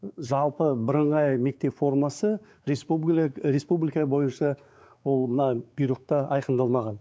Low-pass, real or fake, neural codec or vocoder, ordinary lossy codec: none; fake; codec, 16 kHz, 4 kbps, X-Codec, WavLM features, trained on Multilingual LibriSpeech; none